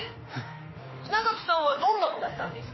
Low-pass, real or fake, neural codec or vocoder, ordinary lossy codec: 7.2 kHz; fake; autoencoder, 48 kHz, 32 numbers a frame, DAC-VAE, trained on Japanese speech; MP3, 24 kbps